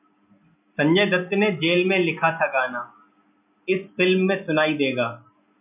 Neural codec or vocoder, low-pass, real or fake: none; 3.6 kHz; real